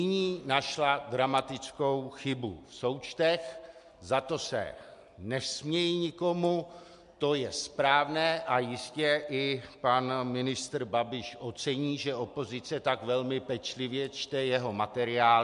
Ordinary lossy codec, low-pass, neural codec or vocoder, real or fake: MP3, 64 kbps; 10.8 kHz; none; real